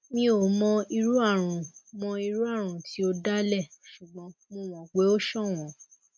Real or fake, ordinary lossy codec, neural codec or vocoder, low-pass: real; none; none; none